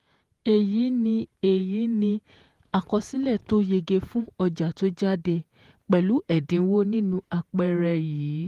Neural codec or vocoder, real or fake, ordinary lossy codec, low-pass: vocoder, 48 kHz, 128 mel bands, Vocos; fake; Opus, 24 kbps; 14.4 kHz